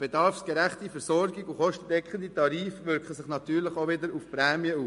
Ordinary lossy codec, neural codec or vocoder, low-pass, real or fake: MP3, 48 kbps; none; 10.8 kHz; real